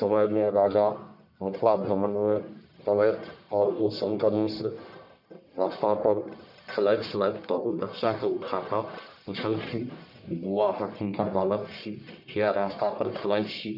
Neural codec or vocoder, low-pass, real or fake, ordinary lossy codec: codec, 44.1 kHz, 1.7 kbps, Pupu-Codec; 5.4 kHz; fake; none